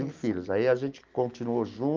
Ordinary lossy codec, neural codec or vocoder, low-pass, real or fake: Opus, 24 kbps; none; 7.2 kHz; real